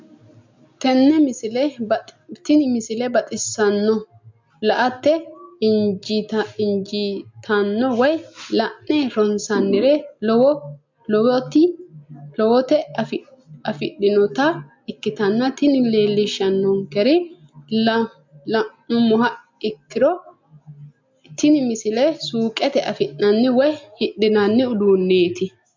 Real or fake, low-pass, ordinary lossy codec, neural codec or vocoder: real; 7.2 kHz; MP3, 64 kbps; none